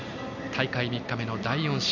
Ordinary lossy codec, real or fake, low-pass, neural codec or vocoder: none; real; 7.2 kHz; none